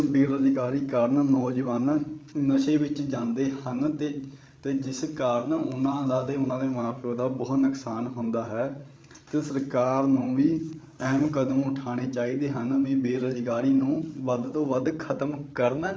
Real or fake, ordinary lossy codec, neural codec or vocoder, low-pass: fake; none; codec, 16 kHz, 8 kbps, FreqCodec, larger model; none